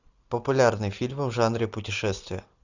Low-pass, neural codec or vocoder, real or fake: 7.2 kHz; none; real